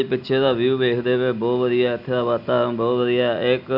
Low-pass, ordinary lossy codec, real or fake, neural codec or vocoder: 5.4 kHz; none; real; none